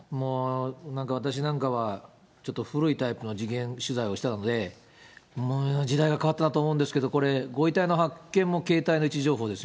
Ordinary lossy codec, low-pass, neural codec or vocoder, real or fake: none; none; none; real